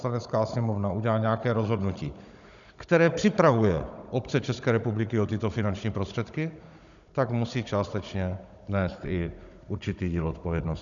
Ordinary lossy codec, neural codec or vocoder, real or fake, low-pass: MP3, 96 kbps; codec, 16 kHz, 16 kbps, FunCodec, trained on Chinese and English, 50 frames a second; fake; 7.2 kHz